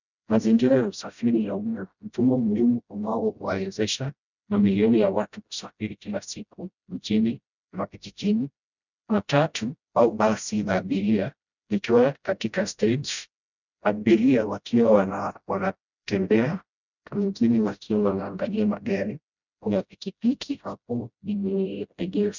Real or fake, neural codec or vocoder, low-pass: fake; codec, 16 kHz, 0.5 kbps, FreqCodec, smaller model; 7.2 kHz